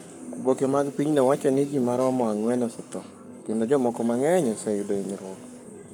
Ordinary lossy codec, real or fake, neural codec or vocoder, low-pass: none; fake; codec, 44.1 kHz, 7.8 kbps, Pupu-Codec; 19.8 kHz